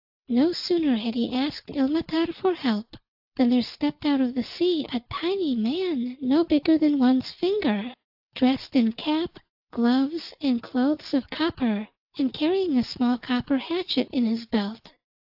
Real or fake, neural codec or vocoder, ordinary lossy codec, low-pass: real; none; AAC, 48 kbps; 5.4 kHz